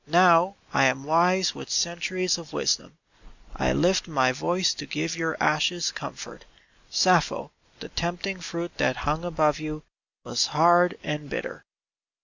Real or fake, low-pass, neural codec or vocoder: real; 7.2 kHz; none